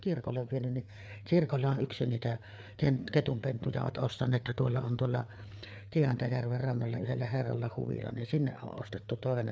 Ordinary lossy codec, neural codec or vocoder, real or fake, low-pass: none; codec, 16 kHz, 4 kbps, FreqCodec, larger model; fake; none